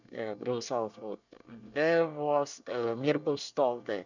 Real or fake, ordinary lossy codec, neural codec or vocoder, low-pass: fake; none; codec, 24 kHz, 1 kbps, SNAC; 7.2 kHz